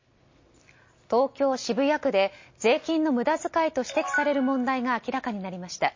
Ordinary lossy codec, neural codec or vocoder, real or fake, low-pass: MP3, 32 kbps; none; real; 7.2 kHz